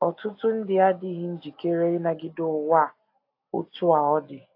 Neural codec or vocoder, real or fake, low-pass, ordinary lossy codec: none; real; 5.4 kHz; AAC, 32 kbps